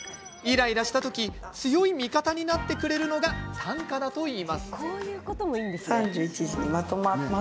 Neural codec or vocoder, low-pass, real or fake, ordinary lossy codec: none; none; real; none